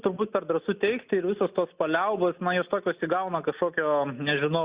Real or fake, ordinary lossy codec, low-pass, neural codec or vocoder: real; Opus, 64 kbps; 3.6 kHz; none